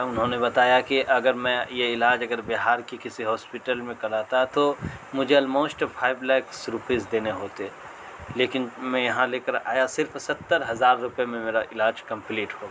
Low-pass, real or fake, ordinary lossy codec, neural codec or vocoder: none; real; none; none